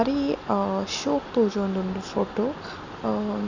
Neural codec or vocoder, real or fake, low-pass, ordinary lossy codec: none; real; 7.2 kHz; none